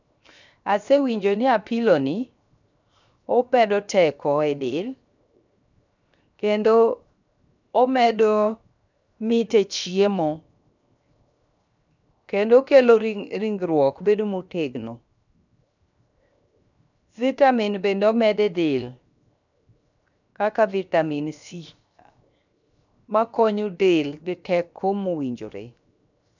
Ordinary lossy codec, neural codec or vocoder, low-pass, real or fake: none; codec, 16 kHz, 0.7 kbps, FocalCodec; 7.2 kHz; fake